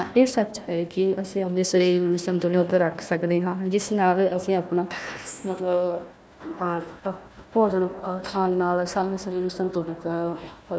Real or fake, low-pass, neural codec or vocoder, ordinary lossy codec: fake; none; codec, 16 kHz, 1 kbps, FunCodec, trained on Chinese and English, 50 frames a second; none